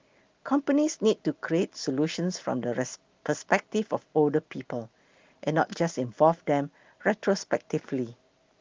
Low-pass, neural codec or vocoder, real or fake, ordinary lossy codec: 7.2 kHz; none; real; Opus, 32 kbps